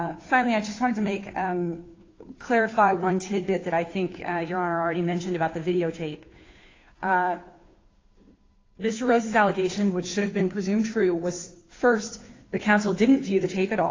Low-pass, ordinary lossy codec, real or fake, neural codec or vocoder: 7.2 kHz; AAC, 32 kbps; fake; codec, 16 kHz, 2 kbps, FunCodec, trained on Chinese and English, 25 frames a second